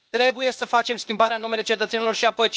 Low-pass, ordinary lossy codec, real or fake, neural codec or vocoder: none; none; fake; codec, 16 kHz, 0.8 kbps, ZipCodec